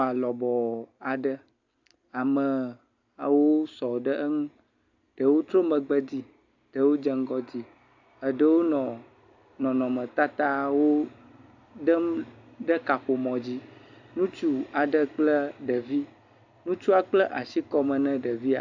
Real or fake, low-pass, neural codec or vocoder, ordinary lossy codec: real; 7.2 kHz; none; AAC, 48 kbps